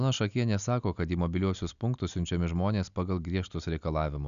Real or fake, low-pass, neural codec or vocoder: real; 7.2 kHz; none